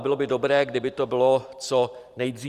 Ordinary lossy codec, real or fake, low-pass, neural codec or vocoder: Opus, 32 kbps; fake; 14.4 kHz; vocoder, 44.1 kHz, 128 mel bands every 512 samples, BigVGAN v2